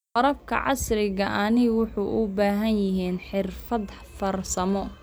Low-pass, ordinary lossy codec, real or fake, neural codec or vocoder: none; none; real; none